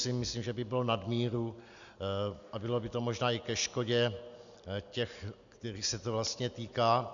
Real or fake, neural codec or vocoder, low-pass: real; none; 7.2 kHz